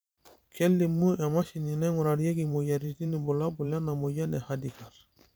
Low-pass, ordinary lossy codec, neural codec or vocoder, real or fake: none; none; vocoder, 44.1 kHz, 128 mel bands every 512 samples, BigVGAN v2; fake